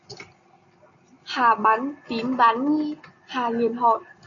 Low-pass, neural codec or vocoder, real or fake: 7.2 kHz; none; real